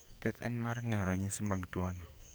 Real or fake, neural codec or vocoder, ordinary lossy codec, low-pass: fake; codec, 44.1 kHz, 2.6 kbps, SNAC; none; none